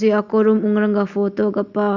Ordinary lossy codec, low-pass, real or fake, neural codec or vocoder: Opus, 64 kbps; 7.2 kHz; real; none